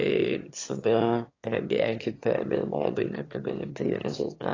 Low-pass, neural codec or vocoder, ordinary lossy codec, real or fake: 7.2 kHz; autoencoder, 22.05 kHz, a latent of 192 numbers a frame, VITS, trained on one speaker; AAC, 32 kbps; fake